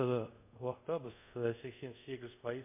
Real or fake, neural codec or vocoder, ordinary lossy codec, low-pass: fake; codec, 24 kHz, 0.5 kbps, DualCodec; MP3, 24 kbps; 3.6 kHz